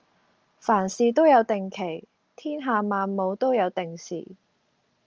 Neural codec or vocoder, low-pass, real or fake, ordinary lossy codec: none; 7.2 kHz; real; Opus, 24 kbps